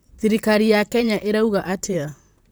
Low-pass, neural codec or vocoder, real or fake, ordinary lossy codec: none; vocoder, 44.1 kHz, 128 mel bands, Pupu-Vocoder; fake; none